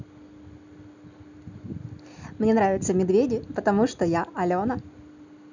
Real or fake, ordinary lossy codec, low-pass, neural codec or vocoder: real; none; 7.2 kHz; none